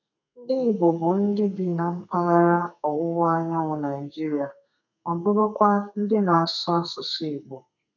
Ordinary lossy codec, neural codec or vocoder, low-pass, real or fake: none; codec, 32 kHz, 1.9 kbps, SNAC; 7.2 kHz; fake